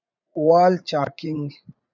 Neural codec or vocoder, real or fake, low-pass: vocoder, 44.1 kHz, 80 mel bands, Vocos; fake; 7.2 kHz